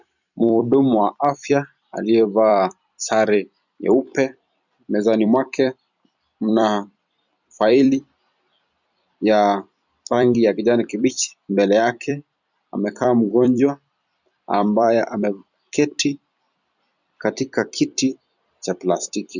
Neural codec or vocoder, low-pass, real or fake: vocoder, 44.1 kHz, 128 mel bands every 256 samples, BigVGAN v2; 7.2 kHz; fake